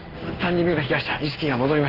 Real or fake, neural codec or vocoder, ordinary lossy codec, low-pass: fake; codec, 16 kHz in and 24 kHz out, 1 kbps, XY-Tokenizer; Opus, 24 kbps; 5.4 kHz